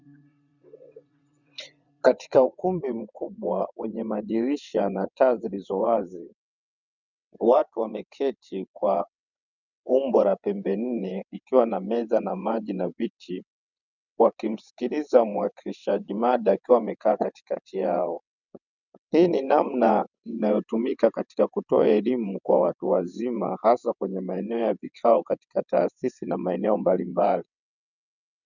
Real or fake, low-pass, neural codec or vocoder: fake; 7.2 kHz; vocoder, 22.05 kHz, 80 mel bands, WaveNeXt